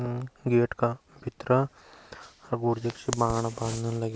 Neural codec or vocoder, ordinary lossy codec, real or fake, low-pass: none; none; real; none